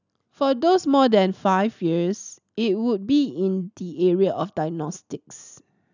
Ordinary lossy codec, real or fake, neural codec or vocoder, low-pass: none; real; none; 7.2 kHz